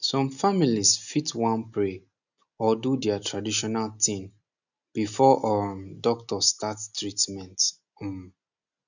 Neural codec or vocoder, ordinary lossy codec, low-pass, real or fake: none; none; 7.2 kHz; real